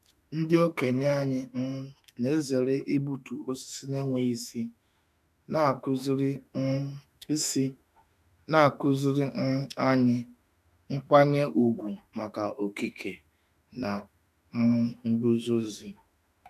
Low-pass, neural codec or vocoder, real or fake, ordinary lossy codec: 14.4 kHz; autoencoder, 48 kHz, 32 numbers a frame, DAC-VAE, trained on Japanese speech; fake; none